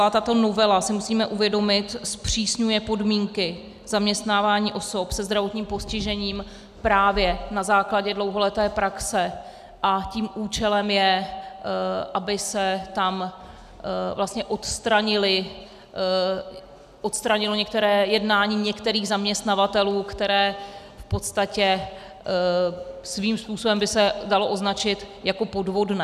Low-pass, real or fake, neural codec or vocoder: 14.4 kHz; real; none